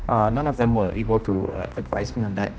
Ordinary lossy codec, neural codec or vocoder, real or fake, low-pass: none; codec, 16 kHz, 1 kbps, X-Codec, HuBERT features, trained on general audio; fake; none